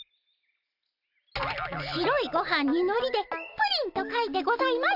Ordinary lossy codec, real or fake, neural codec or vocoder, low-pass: AAC, 48 kbps; real; none; 5.4 kHz